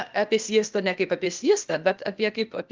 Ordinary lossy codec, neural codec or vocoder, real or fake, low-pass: Opus, 32 kbps; codec, 16 kHz, 0.8 kbps, ZipCodec; fake; 7.2 kHz